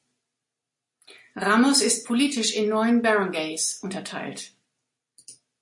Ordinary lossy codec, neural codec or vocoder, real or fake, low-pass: MP3, 48 kbps; none; real; 10.8 kHz